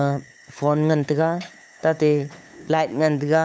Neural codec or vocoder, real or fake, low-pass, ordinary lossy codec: codec, 16 kHz, 8 kbps, FunCodec, trained on LibriTTS, 25 frames a second; fake; none; none